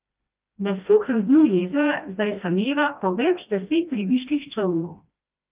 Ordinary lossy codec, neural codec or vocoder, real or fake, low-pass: Opus, 32 kbps; codec, 16 kHz, 1 kbps, FreqCodec, smaller model; fake; 3.6 kHz